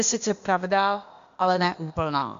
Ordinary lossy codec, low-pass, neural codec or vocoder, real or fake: AAC, 64 kbps; 7.2 kHz; codec, 16 kHz, 0.8 kbps, ZipCodec; fake